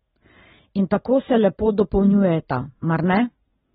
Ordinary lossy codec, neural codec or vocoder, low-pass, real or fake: AAC, 16 kbps; none; 19.8 kHz; real